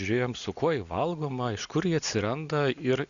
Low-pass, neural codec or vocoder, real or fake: 7.2 kHz; none; real